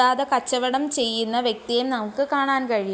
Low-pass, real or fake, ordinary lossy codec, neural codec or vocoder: none; real; none; none